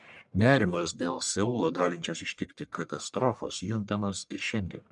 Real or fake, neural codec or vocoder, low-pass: fake; codec, 44.1 kHz, 1.7 kbps, Pupu-Codec; 10.8 kHz